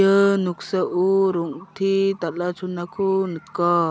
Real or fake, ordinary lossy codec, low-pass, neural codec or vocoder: real; none; none; none